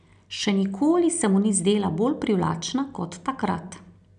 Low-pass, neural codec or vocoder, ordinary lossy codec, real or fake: 9.9 kHz; none; none; real